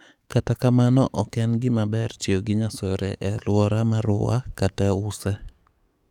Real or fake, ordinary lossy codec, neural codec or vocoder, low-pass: fake; none; codec, 44.1 kHz, 7.8 kbps, DAC; 19.8 kHz